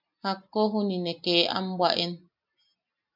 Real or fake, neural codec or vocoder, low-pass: real; none; 5.4 kHz